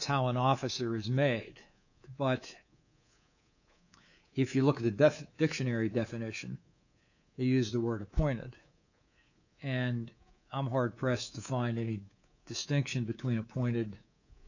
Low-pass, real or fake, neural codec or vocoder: 7.2 kHz; fake; codec, 24 kHz, 3.1 kbps, DualCodec